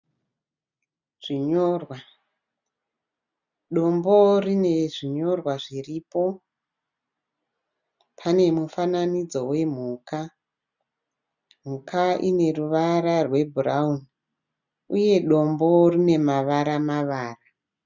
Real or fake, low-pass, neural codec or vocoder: real; 7.2 kHz; none